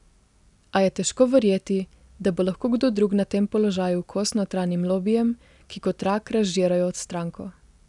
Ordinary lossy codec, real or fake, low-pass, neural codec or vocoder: none; real; 10.8 kHz; none